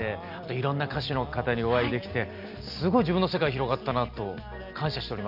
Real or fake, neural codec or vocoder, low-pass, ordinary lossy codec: real; none; 5.4 kHz; none